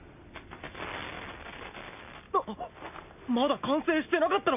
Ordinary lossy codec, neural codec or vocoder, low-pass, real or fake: none; none; 3.6 kHz; real